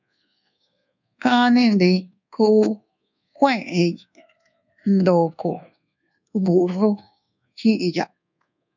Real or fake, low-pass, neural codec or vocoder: fake; 7.2 kHz; codec, 24 kHz, 1.2 kbps, DualCodec